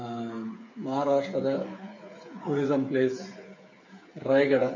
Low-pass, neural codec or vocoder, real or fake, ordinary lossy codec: 7.2 kHz; codec, 16 kHz, 8 kbps, FreqCodec, smaller model; fake; MP3, 32 kbps